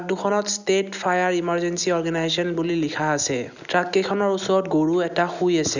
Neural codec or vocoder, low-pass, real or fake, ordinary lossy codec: none; 7.2 kHz; real; none